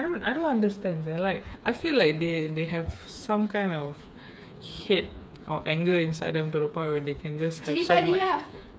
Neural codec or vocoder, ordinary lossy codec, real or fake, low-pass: codec, 16 kHz, 4 kbps, FreqCodec, smaller model; none; fake; none